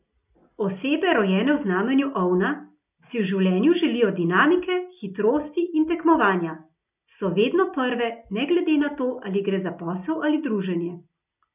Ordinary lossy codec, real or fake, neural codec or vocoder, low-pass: none; real; none; 3.6 kHz